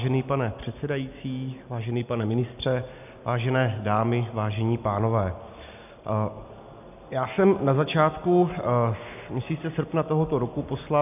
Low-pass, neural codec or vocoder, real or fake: 3.6 kHz; none; real